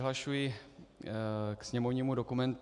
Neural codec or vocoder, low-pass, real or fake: none; 10.8 kHz; real